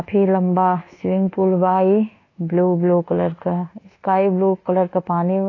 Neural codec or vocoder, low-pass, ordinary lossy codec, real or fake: codec, 24 kHz, 1.2 kbps, DualCodec; 7.2 kHz; AAC, 32 kbps; fake